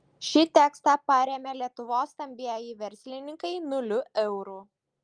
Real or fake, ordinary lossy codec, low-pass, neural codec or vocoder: real; Opus, 32 kbps; 9.9 kHz; none